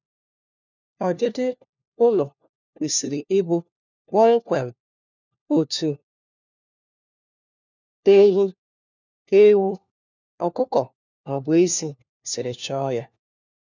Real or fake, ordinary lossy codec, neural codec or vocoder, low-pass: fake; none; codec, 16 kHz, 1 kbps, FunCodec, trained on LibriTTS, 50 frames a second; 7.2 kHz